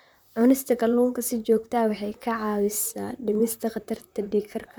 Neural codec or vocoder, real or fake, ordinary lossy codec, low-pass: vocoder, 44.1 kHz, 128 mel bands, Pupu-Vocoder; fake; none; none